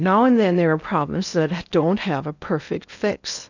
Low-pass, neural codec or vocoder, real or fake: 7.2 kHz; codec, 16 kHz in and 24 kHz out, 0.8 kbps, FocalCodec, streaming, 65536 codes; fake